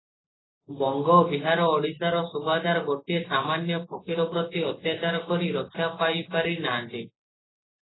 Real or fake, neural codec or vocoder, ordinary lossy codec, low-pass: real; none; AAC, 16 kbps; 7.2 kHz